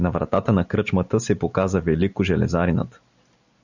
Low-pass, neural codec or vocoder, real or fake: 7.2 kHz; none; real